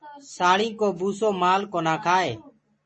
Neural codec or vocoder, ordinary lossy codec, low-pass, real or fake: none; MP3, 32 kbps; 10.8 kHz; real